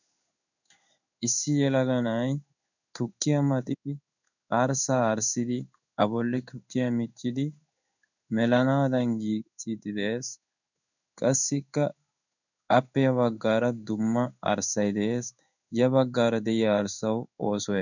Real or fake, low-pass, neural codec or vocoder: fake; 7.2 kHz; codec, 16 kHz in and 24 kHz out, 1 kbps, XY-Tokenizer